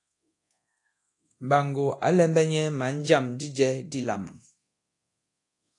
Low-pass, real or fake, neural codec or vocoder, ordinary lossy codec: 10.8 kHz; fake; codec, 24 kHz, 0.9 kbps, DualCodec; AAC, 48 kbps